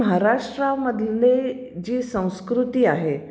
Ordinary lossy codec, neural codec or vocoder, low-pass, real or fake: none; none; none; real